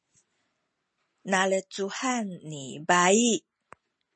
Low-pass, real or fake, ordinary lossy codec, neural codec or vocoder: 9.9 kHz; real; MP3, 32 kbps; none